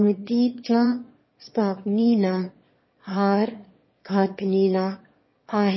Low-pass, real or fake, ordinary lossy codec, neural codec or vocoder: 7.2 kHz; fake; MP3, 24 kbps; autoencoder, 22.05 kHz, a latent of 192 numbers a frame, VITS, trained on one speaker